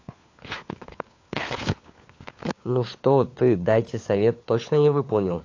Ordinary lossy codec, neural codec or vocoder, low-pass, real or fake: AAC, 48 kbps; codec, 16 kHz, 4 kbps, FunCodec, trained on LibriTTS, 50 frames a second; 7.2 kHz; fake